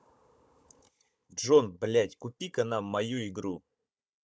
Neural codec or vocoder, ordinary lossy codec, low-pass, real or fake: codec, 16 kHz, 16 kbps, FunCodec, trained on Chinese and English, 50 frames a second; none; none; fake